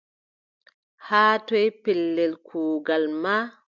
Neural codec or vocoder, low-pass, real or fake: none; 7.2 kHz; real